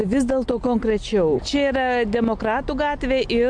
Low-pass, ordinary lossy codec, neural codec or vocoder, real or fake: 9.9 kHz; MP3, 64 kbps; none; real